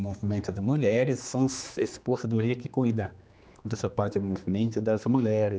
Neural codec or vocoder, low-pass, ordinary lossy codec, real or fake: codec, 16 kHz, 2 kbps, X-Codec, HuBERT features, trained on general audio; none; none; fake